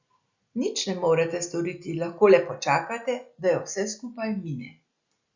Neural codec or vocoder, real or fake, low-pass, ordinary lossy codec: none; real; 7.2 kHz; Opus, 64 kbps